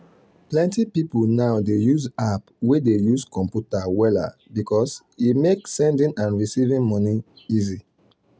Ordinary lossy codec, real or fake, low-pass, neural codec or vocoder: none; real; none; none